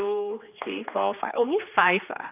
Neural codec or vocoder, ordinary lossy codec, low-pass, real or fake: codec, 16 kHz, 2 kbps, X-Codec, HuBERT features, trained on general audio; AAC, 32 kbps; 3.6 kHz; fake